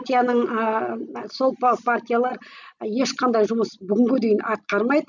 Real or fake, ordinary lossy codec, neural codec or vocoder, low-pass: real; none; none; 7.2 kHz